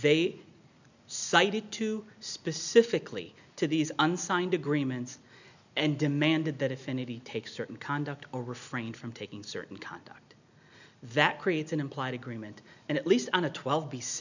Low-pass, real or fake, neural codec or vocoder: 7.2 kHz; real; none